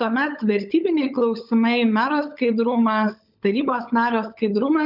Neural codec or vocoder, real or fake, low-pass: codec, 16 kHz, 16 kbps, FunCodec, trained on LibriTTS, 50 frames a second; fake; 5.4 kHz